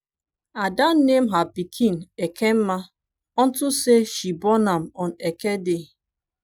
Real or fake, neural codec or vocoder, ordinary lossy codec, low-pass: real; none; none; 19.8 kHz